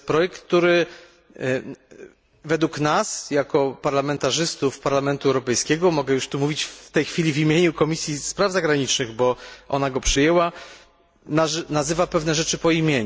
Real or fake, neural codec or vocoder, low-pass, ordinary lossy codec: real; none; none; none